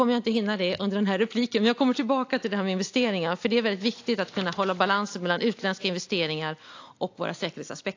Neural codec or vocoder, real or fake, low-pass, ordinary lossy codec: none; real; 7.2 kHz; AAC, 48 kbps